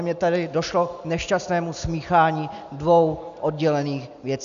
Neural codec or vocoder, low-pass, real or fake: none; 7.2 kHz; real